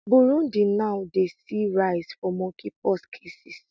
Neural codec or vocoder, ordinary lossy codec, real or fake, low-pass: none; none; real; none